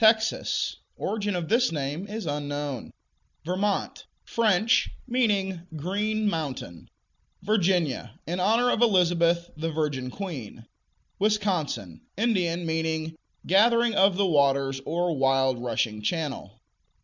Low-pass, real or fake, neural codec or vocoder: 7.2 kHz; real; none